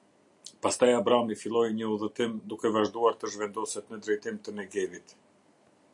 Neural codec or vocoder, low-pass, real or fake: none; 10.8 kHz; real